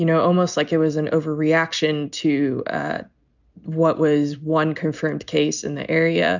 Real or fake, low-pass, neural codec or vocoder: real; 7.2 kHz; none